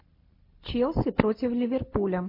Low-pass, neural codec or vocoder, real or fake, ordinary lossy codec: 5.4 kHz; none; real; MP3, 32 kbps